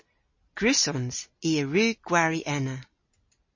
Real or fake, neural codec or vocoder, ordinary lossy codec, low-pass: real; none; MP3, 32 kbps; 7.2 kHz